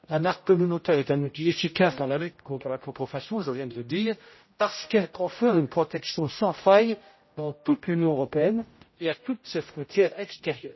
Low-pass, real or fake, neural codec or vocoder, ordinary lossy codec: 7.2 kHz; fake; codec, 16 kHz, 0.5 kbps, X-Codec, HuBERT features, trained on general audio; MP3, 24 kbps